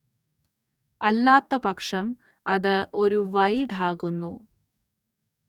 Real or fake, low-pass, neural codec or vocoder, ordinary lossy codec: fake; 19.8 kHz; codec, 44.1 kHz, 2.6 kbps, DAC; none